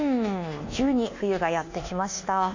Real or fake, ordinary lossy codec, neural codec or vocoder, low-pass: fake; AAC, 48 kbps; codec, 24 kHz, 1.2 kbps, DualCodec; 7.2 kHz